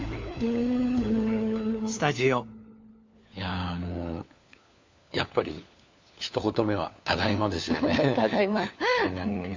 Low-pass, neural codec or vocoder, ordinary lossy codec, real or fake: 7.2 kHz; codec, 16 kHz, 4 kbps, FunCodec, trained on LibriTTS, 50 frames a second; MP3, 64 kbps; fake